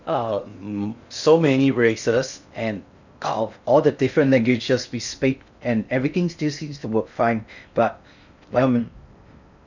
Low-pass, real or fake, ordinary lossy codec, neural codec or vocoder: 7.2 kHz; fake; none; codec, 16 kHz in and 24 kHz out, 0.6 kbps, FocalCodec, streaming, 2048 codes